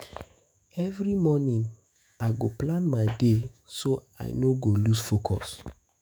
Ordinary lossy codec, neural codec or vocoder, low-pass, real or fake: none; autoencoder, 48 kHz, 128 numbers a frame, DAC-VAE, trained on Japanese speech; none; fake